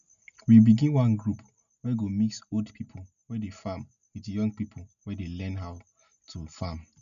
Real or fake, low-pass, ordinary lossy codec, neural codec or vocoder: real; 7.2 kHz; none; none